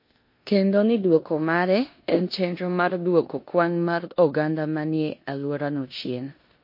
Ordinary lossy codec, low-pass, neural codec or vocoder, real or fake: MP3, 32 kbps; 5.4 kHz; codec, 16 kHz in and 24 kHz out, 0.9 kbps, LongCat-Audio-Codec, four codebook decoder; fake